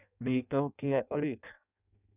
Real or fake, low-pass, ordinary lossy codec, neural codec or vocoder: fake; 3.6 kHz; none; codec, 16 kHz in and 24 kHz out, 0.6 kbps, FireRedTTS-2 codec